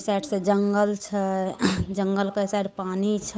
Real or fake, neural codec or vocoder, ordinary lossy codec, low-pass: fake; codec, 16 kHz, 8 kbps, FunCodec, trained on Chinese and English, 25 frames a second; none; none